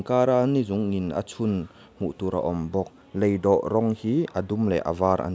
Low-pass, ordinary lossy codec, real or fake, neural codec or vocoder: none; none; real; none